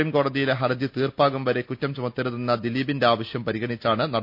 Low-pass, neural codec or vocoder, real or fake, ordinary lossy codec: 5.4 kHz; none; real; none